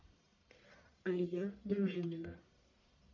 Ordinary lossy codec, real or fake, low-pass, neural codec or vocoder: MP3, 48 kbps; fake; 7.2 kHz; codec, 44.1 kHz, 1.7 kbps, Pupu-Codec